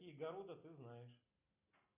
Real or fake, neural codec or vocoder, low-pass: real; none; 3.6 kHz